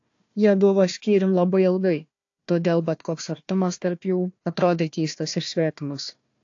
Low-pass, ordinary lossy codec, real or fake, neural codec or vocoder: 7.2 kHz; AAC, 48 kbps; fake; codec, 16 kHz, 1 kbps, FunCodec, trained on Chinese and English, 50 frames a second